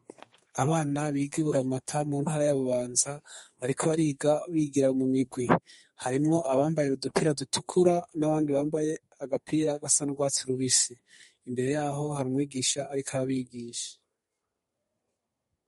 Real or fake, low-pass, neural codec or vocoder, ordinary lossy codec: fake; 14.4 kHz; codec, 32 kHz, 1.9 kbps, SNAC; MP3, 48 kbps